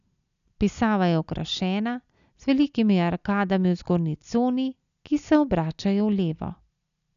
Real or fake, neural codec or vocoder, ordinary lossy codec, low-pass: real; none; none; 7.2 kHz